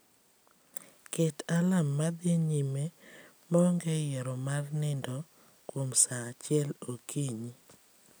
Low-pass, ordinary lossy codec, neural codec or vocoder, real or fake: none; none; none; real